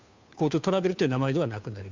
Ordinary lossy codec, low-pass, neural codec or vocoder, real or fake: MP3, 64 kbps; 7.2 kHz; codec, 16 kHz, 2 kbps, FunCodec, trained on Chinese and English, 25 frames a second; fake